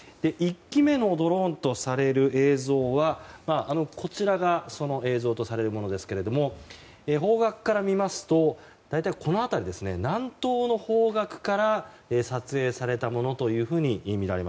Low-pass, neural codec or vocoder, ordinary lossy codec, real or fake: none; none; none; real